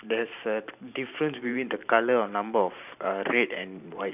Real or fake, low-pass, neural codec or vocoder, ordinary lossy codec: fake; 3.6 kHz; vocoder, 44.1 kHz, 128 mel bands every 512 samples, BigVGAN v2; none